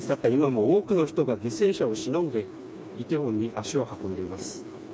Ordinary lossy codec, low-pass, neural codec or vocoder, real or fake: none; none; codec, 16 kHz, 2 kbps, FreqCodec, smaller model; fake